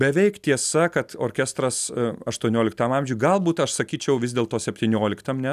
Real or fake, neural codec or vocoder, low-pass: real; none; 14.4 kHz